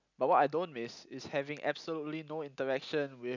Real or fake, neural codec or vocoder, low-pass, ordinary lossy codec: real; none; 7.2 kHz; none